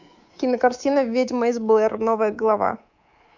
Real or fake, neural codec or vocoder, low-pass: fake; codec, 24 kHz, 3.1 kbps, DualCodec; 7.2 kHz